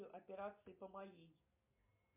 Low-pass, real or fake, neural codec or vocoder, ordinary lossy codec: 3.6 kHz; real; none; MP3, 32 kbps